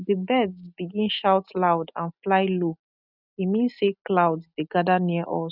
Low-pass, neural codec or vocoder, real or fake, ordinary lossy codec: 5.4 kHz; none; real; none